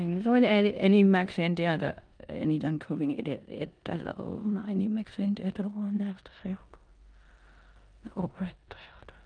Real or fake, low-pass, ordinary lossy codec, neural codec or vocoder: fake; 9.9 kHz; Opus, 32 kbps; codec, 16 kHz in and 24 kHz out, 0.9 kbps, LongCat-Audio-Codec, four codebook decoder